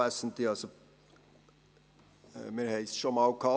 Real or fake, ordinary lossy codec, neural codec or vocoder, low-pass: real; none; none; none